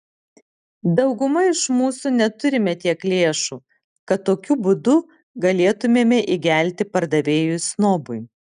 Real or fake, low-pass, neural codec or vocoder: real; 9.9 kHz; none